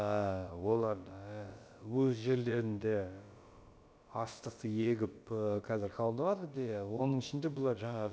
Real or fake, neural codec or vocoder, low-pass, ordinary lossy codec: fake; codec, 16 kHz, about 1 kbps, DyCAST, with the encoder's durations; none; none